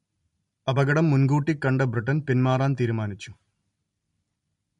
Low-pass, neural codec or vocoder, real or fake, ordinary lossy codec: 10.8 kHz; none; real; MP3, 48 kbps